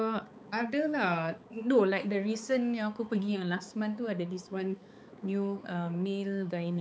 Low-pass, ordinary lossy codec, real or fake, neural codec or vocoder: none; none; fake; codec, 16 kHz, 4 kbps, X-Codec, HuBERT features, trained on balanced general audio